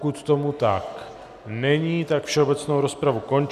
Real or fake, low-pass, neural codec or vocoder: real; 14.4 kHz; none